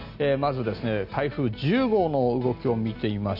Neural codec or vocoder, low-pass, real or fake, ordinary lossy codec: none; 5.4 kHz; real; none